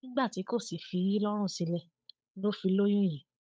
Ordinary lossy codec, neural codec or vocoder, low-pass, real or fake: none; codec, 16 kHz, 8 kbps, FunCodec, trained on Chinese and English, 25 frames a second; none; fake